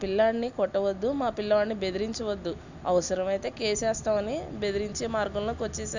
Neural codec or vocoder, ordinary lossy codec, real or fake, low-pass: none; none; real; 7.2 kHz